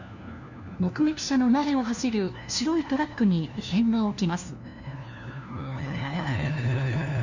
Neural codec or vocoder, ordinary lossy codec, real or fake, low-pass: codec, 16 kHz, 1 kbps, FunCodec, trained on LibriTTS, 50 frames a second; none; fake; 7.2 kHz